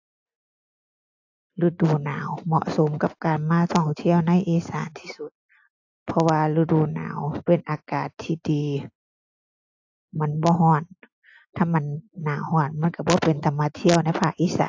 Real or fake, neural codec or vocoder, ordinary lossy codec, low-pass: real; none; AAC, 48 kbps; 7.2 kHz